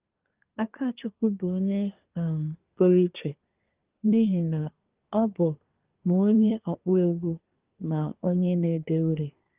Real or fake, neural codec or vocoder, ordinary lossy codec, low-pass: fake; codec, 24 kHz, 1 kbps, SNAC; Opus, 32 kbps; 3.6 kHz